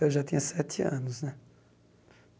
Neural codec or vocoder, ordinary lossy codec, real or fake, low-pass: none; none; real; none